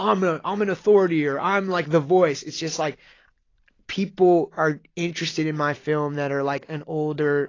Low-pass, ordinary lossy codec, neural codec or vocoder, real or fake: 7.2 kHz; AAC, 32 kbps; none; real